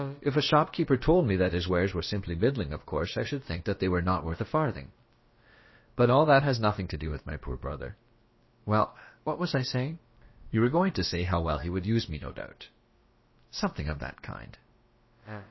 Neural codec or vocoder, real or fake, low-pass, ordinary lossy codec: codec, 16 kHz, about 1 kbps, DyCAST, with the encoder's durations; fake; 7.2 kHz; MP3, 24 kbps